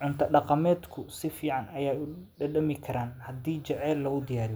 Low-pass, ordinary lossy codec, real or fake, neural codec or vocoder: none; none; real; none